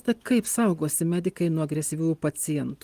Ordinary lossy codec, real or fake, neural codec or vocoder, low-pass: Opus, 24 kbps; real; none; 14.4 kHz